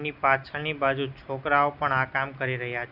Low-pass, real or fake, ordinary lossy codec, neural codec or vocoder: 5.4 kHz; real; none; none